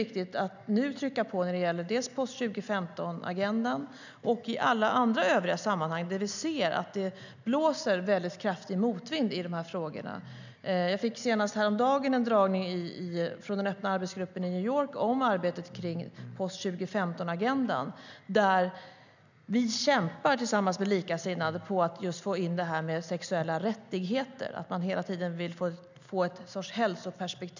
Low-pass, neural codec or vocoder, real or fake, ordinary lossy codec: 7.2 kHz; none; real; none